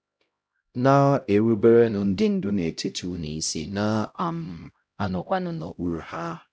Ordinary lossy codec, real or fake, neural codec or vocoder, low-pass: none; fake; codec, 16 kHz, 0.5 kbps, X-Codec, HuBERT features, trained on LibriSpeech; none